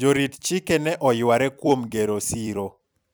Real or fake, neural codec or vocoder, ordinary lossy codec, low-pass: fake; vocoder, 44.1 kHz, 128 mel bands every 256 samples, BigVGAN v2; none; none